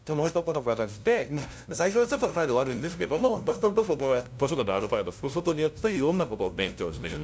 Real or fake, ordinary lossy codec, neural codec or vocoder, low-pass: fake; none; codec, 16 kHz, 0.5 kbps, FunCodec, trained on LibriTTS, 25 frames a second; none